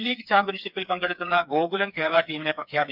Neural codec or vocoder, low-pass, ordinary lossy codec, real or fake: codec, 16 kHz, 4 kbps, FreqCodec, smaller model; 5.4 kHz; none; fake